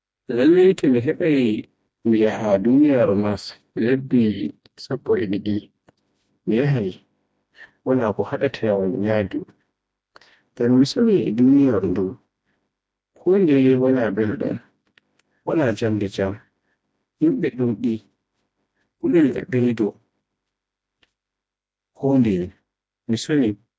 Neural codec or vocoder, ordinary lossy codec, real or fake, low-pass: codec, 16 kHz, 1 kbps, FreqCodec, smaller model; none; fake; none